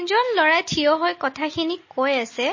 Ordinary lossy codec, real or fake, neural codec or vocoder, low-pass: MP3, 32 kbps; real; none; 7.2 kHz